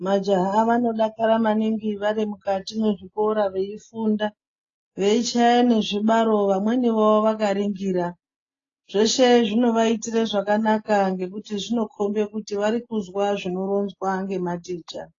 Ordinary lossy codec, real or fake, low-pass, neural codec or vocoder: AAC, 32 kbps; real; 7.2 kHz; none